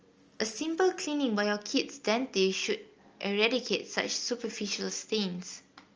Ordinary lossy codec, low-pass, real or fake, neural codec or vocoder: Opus, 24 kbps; 7.2 kHz; real; none